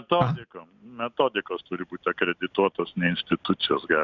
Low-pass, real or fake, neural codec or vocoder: 7.2 kHz; real; none